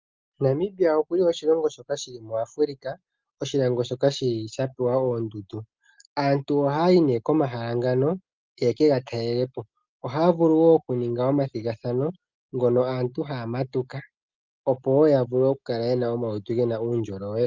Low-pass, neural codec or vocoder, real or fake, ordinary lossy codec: 7.2 kHz; none; real; Opus, 32 kbps